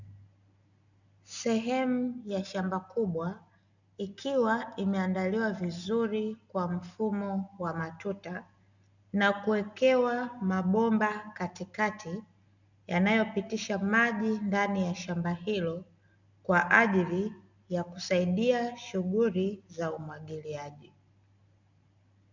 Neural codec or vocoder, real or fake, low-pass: none; real; 7.2 kHz